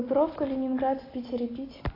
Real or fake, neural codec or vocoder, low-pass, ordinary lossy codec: real; none; 5.4 kHz; AAC, 24 kbps